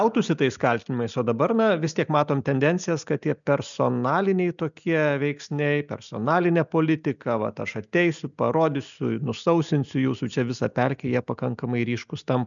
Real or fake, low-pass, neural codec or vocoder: real; 7.2 kHz; none